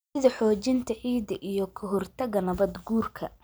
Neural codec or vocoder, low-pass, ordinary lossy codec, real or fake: none; none; none; real